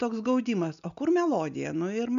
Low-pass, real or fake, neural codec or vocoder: 7.2 kHz; real; none